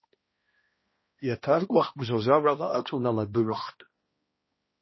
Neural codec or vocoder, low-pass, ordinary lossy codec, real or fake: codec, 16 kHz, 1 kbps, X-Codec, HuBERT features, trained on balanced general audio; 7.2 kHz; MP3, 24 kbps; fake